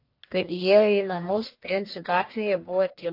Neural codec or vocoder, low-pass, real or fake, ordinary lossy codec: codec, 44.1 kHz, 1.7 kbps, Pupu-Codec; 5.4 kHz; fake; AAC, 32 kbps